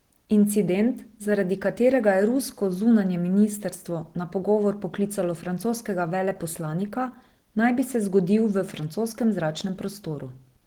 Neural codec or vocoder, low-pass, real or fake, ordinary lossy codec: none; 19.8 kHz; real; Opus, 16 kbps